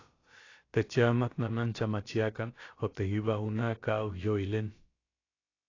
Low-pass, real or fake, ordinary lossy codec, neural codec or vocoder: 7.2 kHz; fake; AAC, 32 kbps; codec, 16 kHz, about 1 kbps, DyCAST, with the encoder's durations